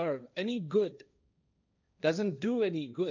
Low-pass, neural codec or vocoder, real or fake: 7.2 kHz; codec, 16 kHz, 1.1 kbps, Voila-Tokenizer; fake